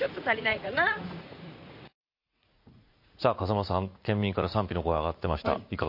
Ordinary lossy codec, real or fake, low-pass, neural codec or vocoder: MP3, 32 kbps; fake; 5.4 kHz; vocoder, 44.1 kHz, 80 mel bands, Vocos